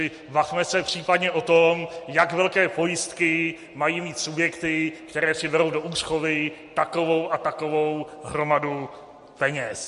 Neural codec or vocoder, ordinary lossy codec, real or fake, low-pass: none; MP3, 48 kbps; real; 14.4 kHz